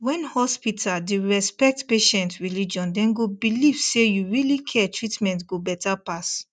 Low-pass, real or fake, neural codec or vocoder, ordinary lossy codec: 9.9 kHz; real; none; none